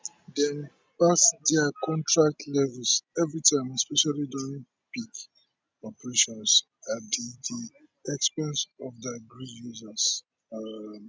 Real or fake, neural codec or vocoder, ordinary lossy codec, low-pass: real; none; none; none